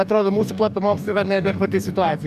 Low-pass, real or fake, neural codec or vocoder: 14.4 kHz; fake; codec, 44.1 kHz, 2.6 kbps, DAC